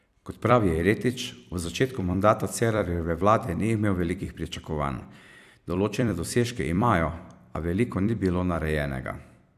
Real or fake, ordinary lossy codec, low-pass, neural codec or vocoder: fake; none; 14.4 kHz; vocoder, 44.1 kHz, 128 mel bands every 256 samples, BigVGAN v2